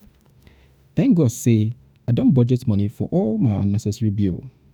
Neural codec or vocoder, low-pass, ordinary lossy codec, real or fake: autoencoder, 48 kHz, 32 numbers a frame, DAC-VAE, trained on Japanese speech; none; none; fake